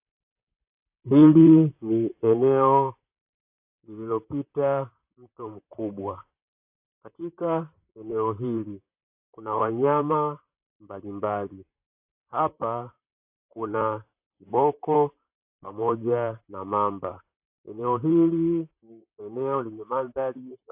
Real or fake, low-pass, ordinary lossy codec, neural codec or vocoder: fake; 3.6 kHz; AAC, 32 kbps; vocoder, 44.1 kHz, 128 mel bands, Pupu-Vocoder